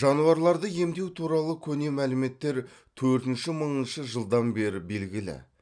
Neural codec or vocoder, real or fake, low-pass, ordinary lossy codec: none; real; 9.9 kHz; none